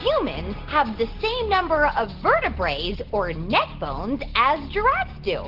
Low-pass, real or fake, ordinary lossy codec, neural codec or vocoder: 5.4 kHz; real; Opus, 16 kbps; none